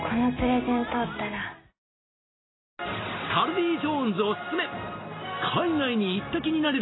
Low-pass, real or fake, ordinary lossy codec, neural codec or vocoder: 7.2 kHz; real; AAC, 16 kbps; none